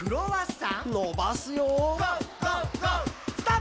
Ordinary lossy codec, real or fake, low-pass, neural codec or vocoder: none; real; none; none